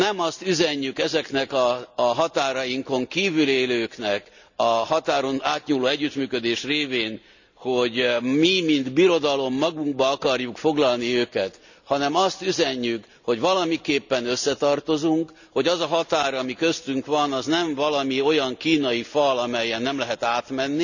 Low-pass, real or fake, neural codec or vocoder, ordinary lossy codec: 7.2 kHz; real; none; none